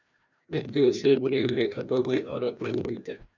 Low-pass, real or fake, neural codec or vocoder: 7.2 kHz; fake; codec, 16 kHz, 1 kbps, FreqCodec, larger model